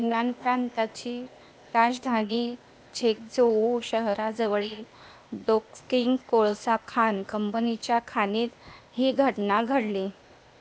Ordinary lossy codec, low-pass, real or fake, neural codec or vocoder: none; none; fake; codec, 16 kHz, 0.8 kbps, ZipCodec